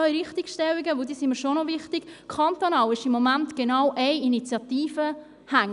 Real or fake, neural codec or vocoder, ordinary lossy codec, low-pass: real; none; none; 10.8 kHz